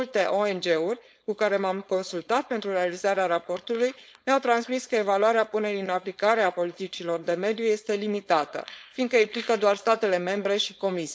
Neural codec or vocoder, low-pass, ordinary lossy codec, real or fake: codec, 16 kHz, 4.8 kbps, FACodec; none; none; fake